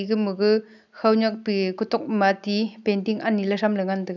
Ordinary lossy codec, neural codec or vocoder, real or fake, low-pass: none; none; real; 7.2 kHz